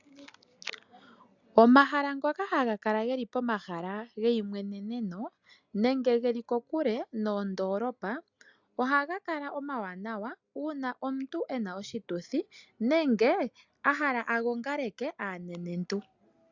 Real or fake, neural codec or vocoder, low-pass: real; none; 7.2 kHz